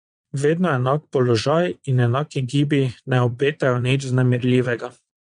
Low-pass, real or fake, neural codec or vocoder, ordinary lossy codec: 9.9 kHz; fake; vocoder, 22.05 kHz, 80 mel bands, Vocos; MP3, 64 kbps